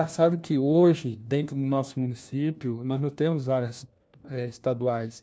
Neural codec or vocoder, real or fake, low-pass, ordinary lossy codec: codec, 16 kHz, 1 kbps, FunCodec, trained on LibriTTS, 50 frames a second; fake; none; none